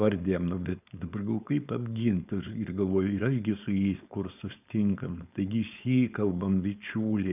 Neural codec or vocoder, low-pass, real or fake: codec, 16 kHz, 4.8 kbps, FACodec; 3.6 kHz; fake